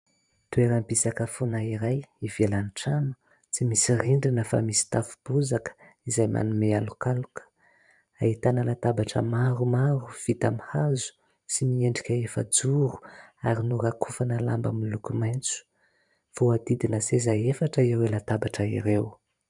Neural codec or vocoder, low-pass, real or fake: vocoder, 44.1 kHz, 128 mel bands every 512 samples, BigVGAN v2; 10.8 kHz; fake